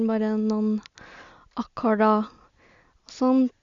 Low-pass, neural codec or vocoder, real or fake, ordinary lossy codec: 7.2 kHz; none; real; Opus, 64 kbps